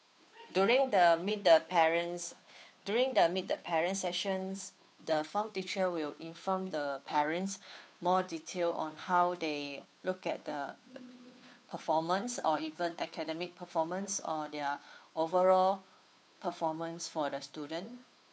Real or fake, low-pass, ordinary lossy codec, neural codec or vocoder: fake; none; none; codec, 16 kHz, 2 kbps, FunCodec, trained on Chinese and English, 25 frames a second